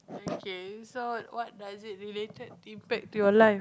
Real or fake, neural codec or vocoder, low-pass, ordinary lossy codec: real; none; none; none